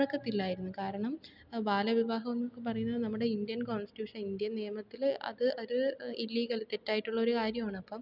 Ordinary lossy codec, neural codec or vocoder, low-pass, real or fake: none; none; 5.4 kHz; real